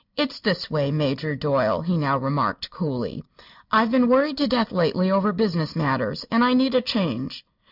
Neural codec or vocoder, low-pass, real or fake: none; 5.4 kHz; real